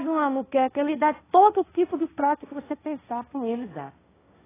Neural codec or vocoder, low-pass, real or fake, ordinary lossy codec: codec, 16 kHz, 1.1 kbps, Voila-Tokenizer; 3.6 kHz; fake; AAC, 16 kbps